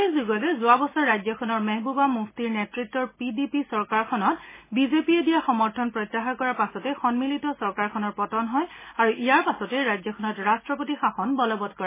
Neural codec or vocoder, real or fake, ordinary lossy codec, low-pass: none; real; MP3, 16 kbps; 3.6 kHz